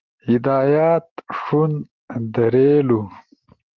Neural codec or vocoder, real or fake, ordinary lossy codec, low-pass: none; real; Opus, 16 kbps; 7.2 kHz